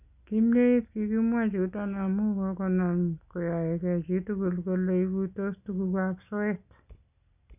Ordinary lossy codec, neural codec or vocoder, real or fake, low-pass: none; none; real; 3.6 kHz